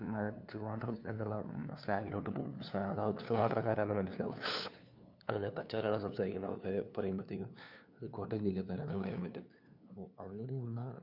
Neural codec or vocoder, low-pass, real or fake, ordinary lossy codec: codec, 16 kHz, 2 kbps, FunCodec, trained on LibriTTS, 25 frames a second; 5.4 kHz; fake; none